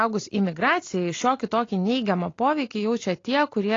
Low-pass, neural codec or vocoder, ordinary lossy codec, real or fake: 7.2 kHz; none; AAC, 32 kbps; real